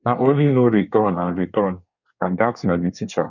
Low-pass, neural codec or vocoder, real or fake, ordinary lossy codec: 7.2 kHz; codec, 24 kHz, 1 kbps, SNAC; fake; none